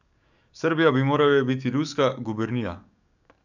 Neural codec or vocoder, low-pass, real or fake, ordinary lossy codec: codec, 44.1 kHz, 7.8 kbps, DAC; 7.2 kHz; fake; none